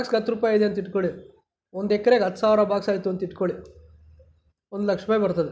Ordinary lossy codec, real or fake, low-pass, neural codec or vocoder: none; real; none; none